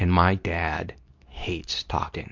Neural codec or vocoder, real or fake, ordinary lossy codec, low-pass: codec, 24 kHz, 0.9 kbps, WavTokenizer, medium speech release version 2; fake; MP3, 64 kbps; 7.2 kHz